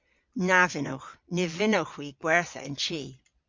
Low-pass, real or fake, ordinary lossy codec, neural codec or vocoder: 7.2 kHz; fake; MP3, 48 kbps; vocoder, 22.05 kHz, 80 mel bands, Vocos